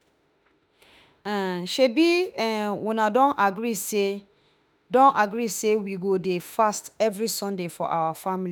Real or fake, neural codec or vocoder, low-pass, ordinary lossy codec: fake; autoencoder, 48 kHz, 32 numbers a frame, DAC-VAE, trained on Japanese speech; none; none